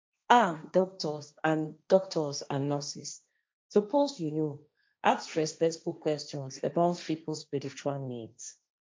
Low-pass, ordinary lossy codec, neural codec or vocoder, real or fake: none; none; codec, 16 kHz, 1.1 kbps, Voila-Tokenizer; fake